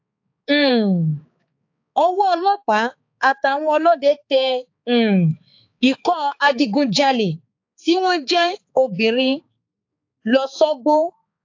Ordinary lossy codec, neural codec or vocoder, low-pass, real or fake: AAC, 48 kbps; codec, 16 kHz, 4 kbps, X-Codec, HuBERT features, trained on balanced general audio; 7.2 kHz; fake